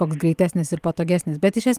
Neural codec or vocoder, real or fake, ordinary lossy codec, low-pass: vocoder, 44.1 kHz, 128 mel bands every 512 samples, BigVGAN v2; fake; Opus, 32 kbps; 14.4 kHz